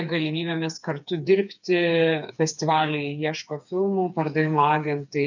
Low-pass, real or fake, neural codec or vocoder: 7.2 kHz; fake; codec, 16 kHz, 4 kbps, FreqCodec, smaller model